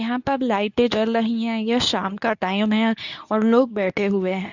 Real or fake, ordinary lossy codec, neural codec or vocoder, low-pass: fake; none; codec, 24 kHz, 0.9 kbps, WavTokenizer, medium speech release version 2; 7.2 kHz